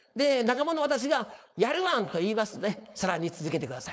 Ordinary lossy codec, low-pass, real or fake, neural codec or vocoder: none; none; fake; codec, 16 kHz, 4.8 kbps, FACodec